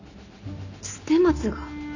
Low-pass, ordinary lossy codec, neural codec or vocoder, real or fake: 7.2 kHz; none; none; real